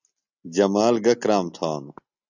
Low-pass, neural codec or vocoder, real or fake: 7.2 kHz; none; real